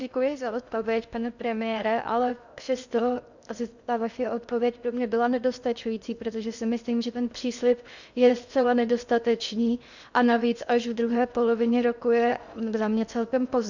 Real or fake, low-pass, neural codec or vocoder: fake; 7.2 kHz; codec, 16 kHz in and 24 kHz out, 0.6 kbps, FocalCodec, streaming, 2048 codes